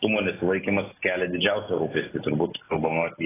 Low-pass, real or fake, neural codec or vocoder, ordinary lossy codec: 3.6 kHz; real; none; AAC, 16 kbps